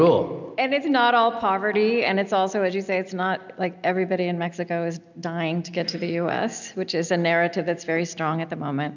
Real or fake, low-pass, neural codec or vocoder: real; 7.2 kHz; none